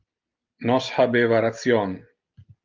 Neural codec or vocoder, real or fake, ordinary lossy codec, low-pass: none; real; Opus, 24 kbps; 7.2 kHz